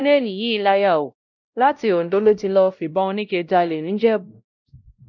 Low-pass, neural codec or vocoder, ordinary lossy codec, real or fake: 7.2 kHz; codec, 16 kHz, 0.5 kbps, X-Codec, WavLM features, trained on Multilingual LibriSpeech; none; fake